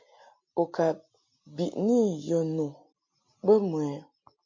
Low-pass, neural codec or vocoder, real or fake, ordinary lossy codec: 7.2 kHz; none; real; MP3, 48 kbps